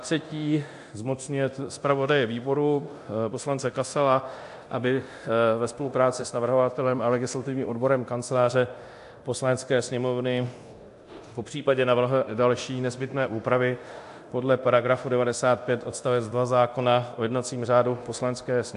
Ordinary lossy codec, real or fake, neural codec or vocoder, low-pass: AAC, 64 kbps; fake; codec, 24 kHz, 0.9 kbps, DualCodec; 10.8 kHz